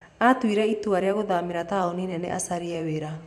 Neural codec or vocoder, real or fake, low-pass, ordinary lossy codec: vocoder, 44.1 kHz, 128 mel bands every 512 samples, BigVGAN v2; fake; 14.4 kHz; none